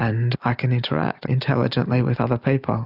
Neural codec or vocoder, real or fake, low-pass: none; real; 5.4 kHz